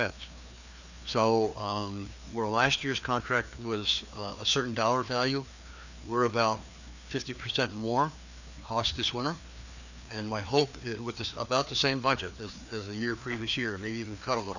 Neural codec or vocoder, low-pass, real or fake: codec, 16 kHz, 2 kbps, FreqCodec, larger model; 7.2 kHz; fake